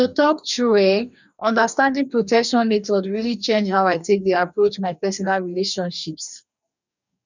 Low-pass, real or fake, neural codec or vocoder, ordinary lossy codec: 7.2 kHz; fake; codec, 44.1 kHz, 2.6 kbps, DAC; none